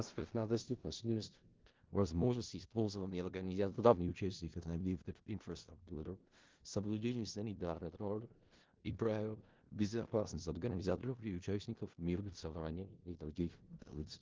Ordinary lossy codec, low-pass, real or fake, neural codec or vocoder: Opus, 16 kbps; 7.2 kHz; fake; codec, 16 kHz in and 24 kHz out, 0.4 kbps, LongCat-Audio-Codec, four codebook decoder